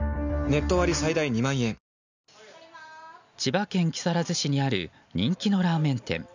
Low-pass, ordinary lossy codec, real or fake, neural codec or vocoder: 7.2 kHz; none; real; none